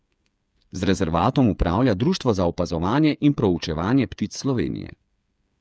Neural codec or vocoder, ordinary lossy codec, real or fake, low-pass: codec, 16 kHz, 8 kbps, FreqCodec, smaller model; none; fake; none